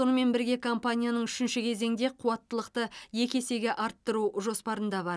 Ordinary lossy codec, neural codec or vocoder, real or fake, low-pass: none; none; real; none